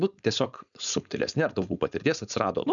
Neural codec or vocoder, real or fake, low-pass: codec, 16 kHz, 4.8 kbps, FACodec; fake; 7.2 kHz